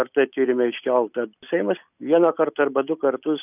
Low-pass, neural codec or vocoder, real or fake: 3.6 kHz; none; real